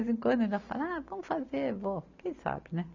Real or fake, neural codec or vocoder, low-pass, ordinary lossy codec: real; none; 7.2 kHz; none